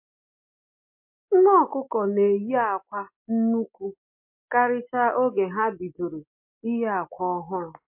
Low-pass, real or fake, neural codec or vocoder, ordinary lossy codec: 3.6 kHz; real; none; MP3, 32 kbps